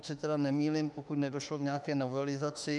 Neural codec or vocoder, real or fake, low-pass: autoencoder, 48 kHz, 32 numbers a frame, DAC-VAE, trained on Japanese speech; fake; 10.8 kHz